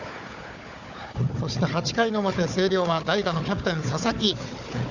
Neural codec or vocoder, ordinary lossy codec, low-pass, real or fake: codec, 16 kHz, 4 kbps, FunCodec, trained on Chinese and English, 50 frames a second; none; 7.2 kHz; fake